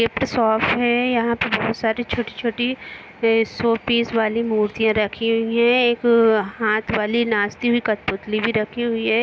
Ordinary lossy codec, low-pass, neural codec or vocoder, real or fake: none; none; none; real